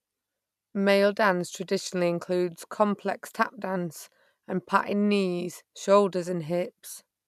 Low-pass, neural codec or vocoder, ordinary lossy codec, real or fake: 14.4 kHz; none; none; real